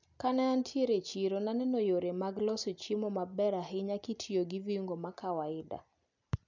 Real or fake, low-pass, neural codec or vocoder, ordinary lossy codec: real; 7.2 kHz; none; none